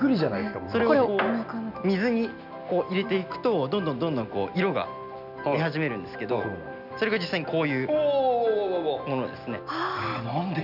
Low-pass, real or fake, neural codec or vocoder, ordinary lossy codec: 5.4 kHz; real; none; none